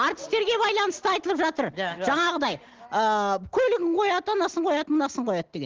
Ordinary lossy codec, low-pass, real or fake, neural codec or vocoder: Opus, 16 kbps; 7.2 kHz; real; none